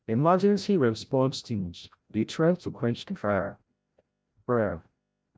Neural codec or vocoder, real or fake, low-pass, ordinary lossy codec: codec, 16 kHz, 0.5 kbps, FreqCodec, larger model; fake; none; none